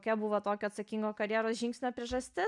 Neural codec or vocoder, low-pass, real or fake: none; 10.8 kHz; real